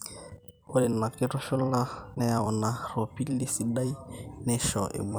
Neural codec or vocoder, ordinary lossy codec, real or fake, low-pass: vocoder, 44.1 kHz, 128 mel bands every 256 samples, BigVGAN v2; none; fake; none